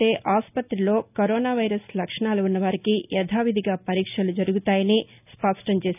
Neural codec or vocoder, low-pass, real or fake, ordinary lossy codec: none; 3.6 kHz; real; none